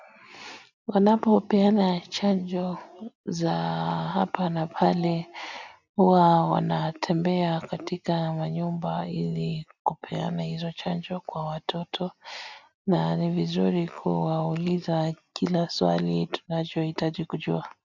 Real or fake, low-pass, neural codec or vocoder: real; 7.2 kHz; none